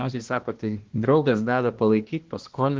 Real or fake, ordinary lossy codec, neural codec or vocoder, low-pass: fake; Opus, 16 kbps; codec, 16 kHz, 1 kbps, X-Codec, HuBERT features, trained on balanced general audio; 7.2 kHz